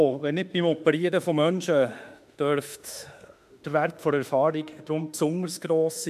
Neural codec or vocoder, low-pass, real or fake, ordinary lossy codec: autoencoder, 48 kHz, 32 numbers a frame, DAC-VAE, trained on Japanese speech; 14.4 kHz; fake; none